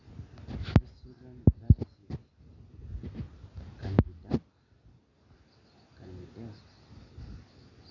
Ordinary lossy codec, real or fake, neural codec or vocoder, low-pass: none; real; none; 7.2 kHz